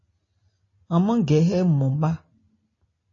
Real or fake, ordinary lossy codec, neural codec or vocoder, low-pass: real; AAC, 32 kbps; none; 7.2 kHz